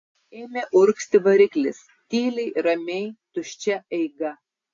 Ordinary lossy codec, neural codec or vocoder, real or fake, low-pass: AAC, 48 kbps; none; real; 7.2 kHz